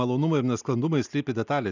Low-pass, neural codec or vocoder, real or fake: 7.2 kHz; none; real